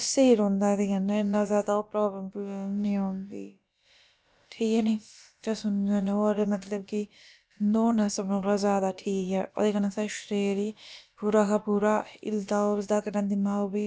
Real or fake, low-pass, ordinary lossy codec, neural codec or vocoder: fake; none; none; codec, 16 kHz, about 1 kbps, DyCAST, with the encoder's durations